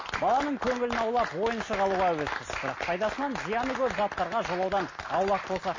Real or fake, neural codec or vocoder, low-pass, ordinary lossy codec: real; none; 7.2 kHz; MP3, 32 kbps